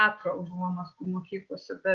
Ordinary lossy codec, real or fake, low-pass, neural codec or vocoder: Opus, 16 kbps; real; 7.2 kHz; none